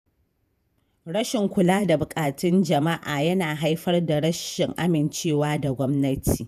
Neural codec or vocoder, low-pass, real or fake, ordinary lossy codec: vocoder, 48 kHz, 128 mel bands, Vocos; 14.4 kHz; fake; none